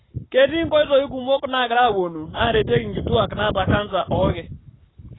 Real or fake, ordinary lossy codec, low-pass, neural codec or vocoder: fake; AAC, 16 kbps; 7.2 kHz; codec, 44.1 kHz, 7.8 kbps, Pupu-Codec